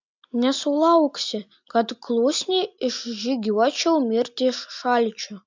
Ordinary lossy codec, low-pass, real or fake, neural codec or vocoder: MP3, 64 kbps; 7.2 kHz; real; none